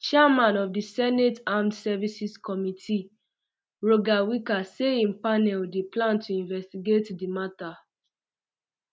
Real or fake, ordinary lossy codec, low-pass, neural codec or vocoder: real; none; none; none